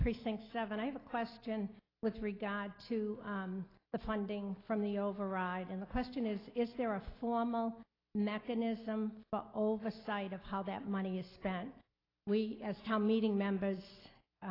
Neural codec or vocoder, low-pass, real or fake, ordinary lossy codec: none; 5.4 kHz; real; AAC, 24 kbps